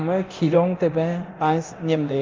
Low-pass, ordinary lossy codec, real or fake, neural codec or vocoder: 7.2 kHz; Opus, 24 kbps; fake; codec, 24 kHz, 0.9 kbps, DualCodec